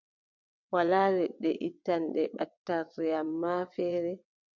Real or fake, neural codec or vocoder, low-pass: fake; vocoder, 22.05 kHz, 80 mel bands, WaveNeXt; 7.2 kHz